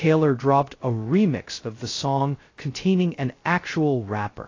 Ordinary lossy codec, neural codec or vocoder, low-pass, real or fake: AAC, 32 kbps; codec, 16 kHz, 0.2 kbps, FocalCodec; 7.2 kHz; fake